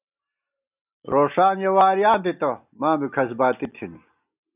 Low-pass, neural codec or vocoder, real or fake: 3.6 kHz; none; real